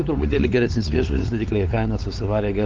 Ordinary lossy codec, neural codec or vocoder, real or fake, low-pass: Opus, 32 kbps; codec, 16 kHz, 4 kbps, X-Codec, WavLM features, trained on Multilingual LibriSpeech; fake; 7.2 kHz